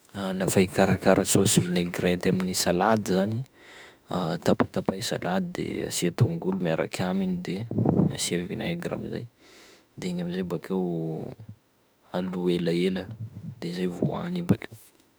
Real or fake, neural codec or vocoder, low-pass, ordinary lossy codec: fake; autoencoder, 48 kHz, 32 numbers a frame, DAC-VAE, trained on Japanese speech; none; none